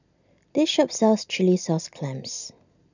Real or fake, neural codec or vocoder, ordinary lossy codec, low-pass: real; none; none; 7.2 kHz